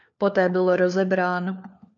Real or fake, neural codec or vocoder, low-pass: fake; codec, 16 kHz, 4 kbps, FunCodec, trained on LibriTTS, 50 frames a second; 7.2 kHz